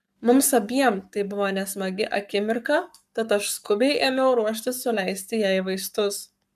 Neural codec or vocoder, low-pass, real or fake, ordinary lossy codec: codec, 44.1 kHz, 7.8 kbps, Pupu-Codec; 14.4 kHz; fake; MP3, 96 kbps